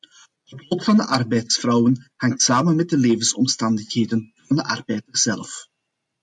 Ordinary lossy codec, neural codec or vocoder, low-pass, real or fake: MP3, 64 kbps; none; 10.8 kHz; real